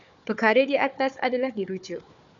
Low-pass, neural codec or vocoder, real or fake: 7.2 kHz; codec, 16 kHz, 4 kbps, FunCodec, trained on Chinese and English, 50 frames a second; fake